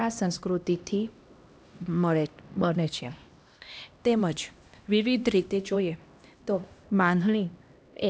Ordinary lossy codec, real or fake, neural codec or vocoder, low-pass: none; fake; codec, 16 kHz, 1 kbps, X-Codec, HuBERT features, trained on LibriSpeech; none